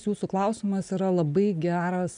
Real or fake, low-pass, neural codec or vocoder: real; 9.9 kHz; none